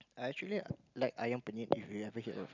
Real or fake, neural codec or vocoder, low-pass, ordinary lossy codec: real; none; 7.2 kHz; none